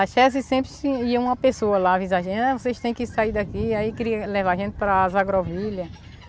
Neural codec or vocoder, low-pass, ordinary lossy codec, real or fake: none; none; none; real